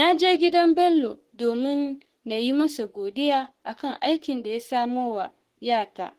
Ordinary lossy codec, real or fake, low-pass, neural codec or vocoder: Opus, 16 kbps; fake; 14.4 kHz; codec, 44.1 kHz, 3.4 kbps, Pupu-Codec